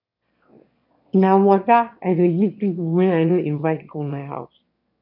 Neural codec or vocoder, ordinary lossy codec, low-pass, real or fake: autoencoder, 22.05 kHz, a latent of 192 numbers a frame, VITS, trained on one speaker; none; 5.4 kHz; fake